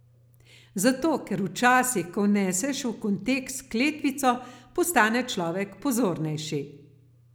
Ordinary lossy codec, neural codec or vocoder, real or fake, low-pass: none; none; real; none